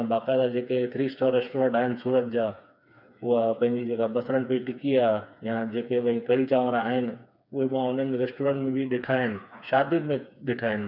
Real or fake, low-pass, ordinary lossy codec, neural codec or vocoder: fake; 5.4 kHz; none; codec, 16 kHz, 4 kbps, FreqCodec, smaller model